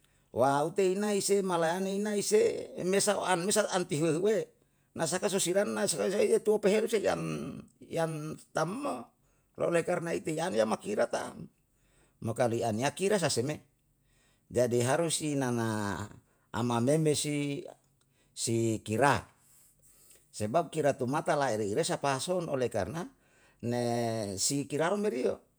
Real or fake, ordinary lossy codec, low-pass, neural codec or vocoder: fake; none; none; vocoder, 48 kHz, 128 mel bands, Vocos